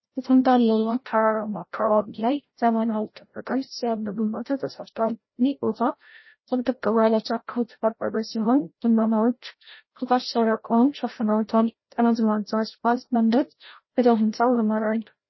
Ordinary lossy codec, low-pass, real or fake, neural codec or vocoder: MP3, 24 kbps; 7.2 kHz; fake; codec, 16 kHz, 0.5 kbps, FreqCodec, larger model